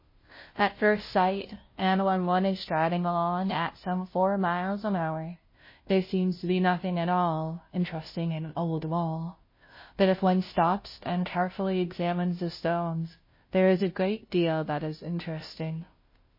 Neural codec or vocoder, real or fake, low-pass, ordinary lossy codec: codec, 16 kHz, 0.5 kbps, FunCodec, trained on Chinese and English, 25 frames a second; fake; 5.4 kHz; MP3, 24 kbps